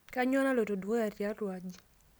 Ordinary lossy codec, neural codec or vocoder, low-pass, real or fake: none; none; none; real